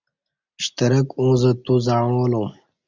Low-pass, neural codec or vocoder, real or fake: 7.2 kHz; none; real